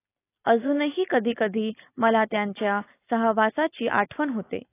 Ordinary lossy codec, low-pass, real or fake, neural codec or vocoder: AAC, 24 kbps; 3.6 kHz; real; none